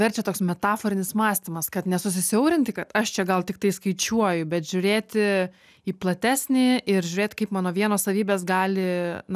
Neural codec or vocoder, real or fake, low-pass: none; real; 14.4 kHz